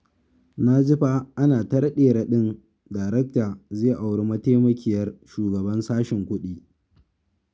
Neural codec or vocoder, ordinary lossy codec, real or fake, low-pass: none; none; real; none